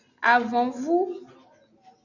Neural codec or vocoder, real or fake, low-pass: none; real; 7.2 kHz